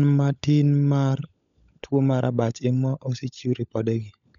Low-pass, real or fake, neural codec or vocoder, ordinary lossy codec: 7.2 kHz; fake; codec, 16 kHz, 16 kbps, FunCodec, trained on LibriTTS, 50 frames a second; none